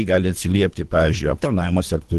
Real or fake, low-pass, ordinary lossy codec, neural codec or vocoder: fake; 10.8 kHz; Opus, 16 kbps; codec, 24 kHz, 3 kbps, HILCodec